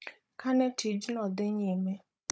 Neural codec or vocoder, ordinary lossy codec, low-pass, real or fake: codec, 16 kHz, 16 kbps, FunCodec, trained on Chinese and English, 50 frames a second; none; none; fake